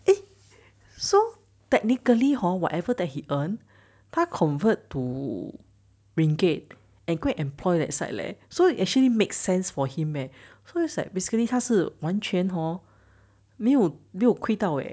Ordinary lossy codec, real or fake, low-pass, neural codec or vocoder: none; real; none; none